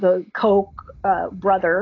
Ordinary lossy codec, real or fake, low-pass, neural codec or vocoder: AAC, 32 kbps; real; 7.2 kHz; none